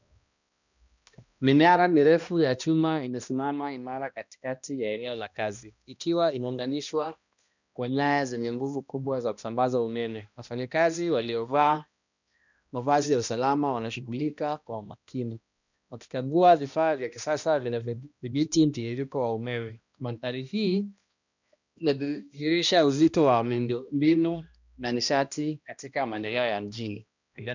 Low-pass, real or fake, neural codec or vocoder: 7.2 kHz; fake; codec, 16 kHz, 1 kbps, X-Codec, HuBERT features, trained on balanced general audio